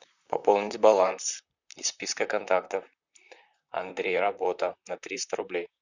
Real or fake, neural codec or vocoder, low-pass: fake; vocoder, 44.1 kHz, 128 mel bands, Pupu-Vocoder; 7.2 kHz